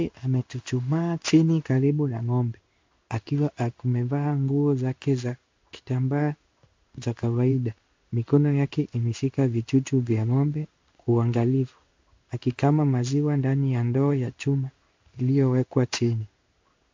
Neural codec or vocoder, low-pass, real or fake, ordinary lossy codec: codec, 16 kHz in and 24 kHz out, 1 kbps, XY-Tokenizer; 7.2 kHz; fake; MP3, 64 kbps